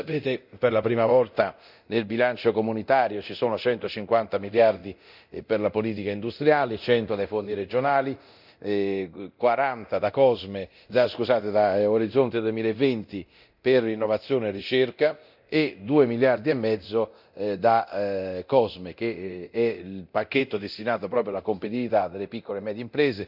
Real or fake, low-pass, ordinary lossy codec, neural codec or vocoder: fake; 5.4 kHz; none; codec, 24 kHz, 0.9 kbps, DualCodec